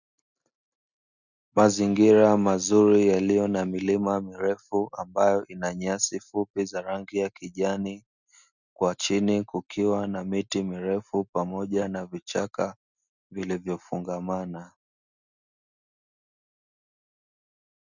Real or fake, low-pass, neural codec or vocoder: real; 7.2 kHz; none